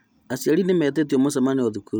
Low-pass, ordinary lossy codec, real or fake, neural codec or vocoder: none; none; real; none